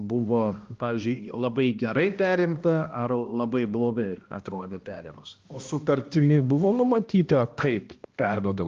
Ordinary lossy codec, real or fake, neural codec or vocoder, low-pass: Opus, 32 kbps; fake; codec, 16 kHz, 1 kbps, X-Codec, HuBERT features, trained on balanced general audio; 7.2 kHz